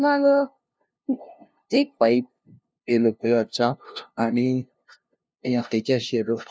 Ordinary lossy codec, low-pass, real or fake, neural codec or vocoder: none; none; fake; codec, 16 kHz, 0.5 kbps, FunCodec, trained on LibriTTS, 25 frames a second